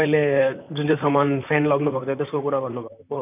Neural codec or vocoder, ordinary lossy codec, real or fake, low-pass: vocoder, 44.1 kHz, 128 mel bands, Pupu-Vocoder; none; fake; 3.6 kHz